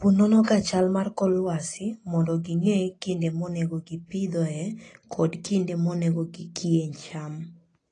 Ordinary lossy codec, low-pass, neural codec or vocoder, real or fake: AAC, 32 kbps; 9.9 kHz; none; real